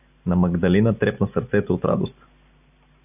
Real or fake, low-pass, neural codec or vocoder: real; 3.6 kHz; none